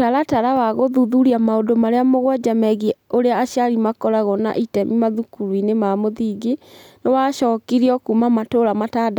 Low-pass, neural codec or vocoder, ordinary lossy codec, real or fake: 19.8 kHz; none; none; real